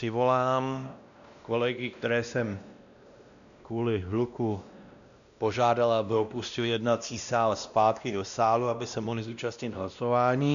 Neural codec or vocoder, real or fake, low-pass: codec, 16 kHz, 1 kbps, X-Codec, WavLM features, trained on Multilingual LibriSpeech; fake; 7.2 kHz